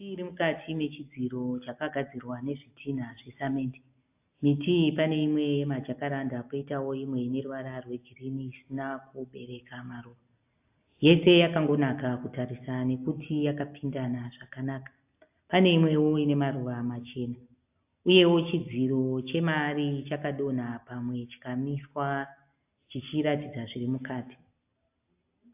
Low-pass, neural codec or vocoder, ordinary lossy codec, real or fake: 3.6 kHz; none; AAC, 32 kbps; real